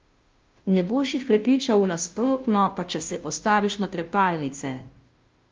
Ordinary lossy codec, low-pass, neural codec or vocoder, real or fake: Opus, 32 kbps; 7.2 kHz; codec, 16 kHz, 0.5 kbps, FunCodec, trained on Chinese and English, 25 frames a second; fake